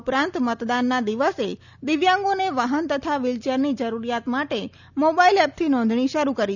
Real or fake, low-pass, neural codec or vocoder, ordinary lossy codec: real; 7.2 kHz; none; none